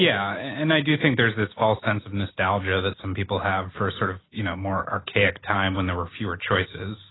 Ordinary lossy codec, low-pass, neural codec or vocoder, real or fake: AAC, 16 kbps; 7.2 kHz; none; real